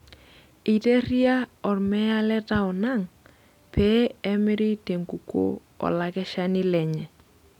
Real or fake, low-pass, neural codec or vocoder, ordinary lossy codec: real; 19.8 kHz; none; none